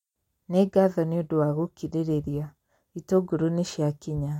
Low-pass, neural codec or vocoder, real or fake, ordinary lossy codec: 19.8 kHz; none; real; MP3, 64 kbps